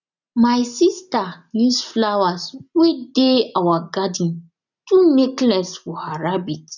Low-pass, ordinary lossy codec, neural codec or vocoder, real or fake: 7.2 kHz; none; none; real